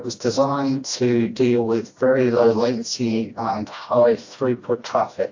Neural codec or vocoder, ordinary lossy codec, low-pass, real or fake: codec, 16 kHz, 1 kbps, FreqCodec, smaller model; AAC, 48 kbps; 7.2 kHz; fake